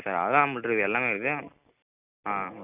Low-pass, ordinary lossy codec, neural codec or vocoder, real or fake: 3.6 kHz; none; none; real